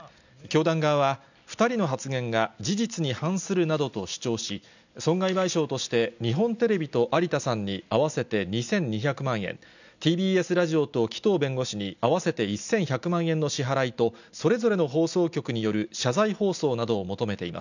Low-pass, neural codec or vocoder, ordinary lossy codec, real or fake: 7.2 kHz; none; none; real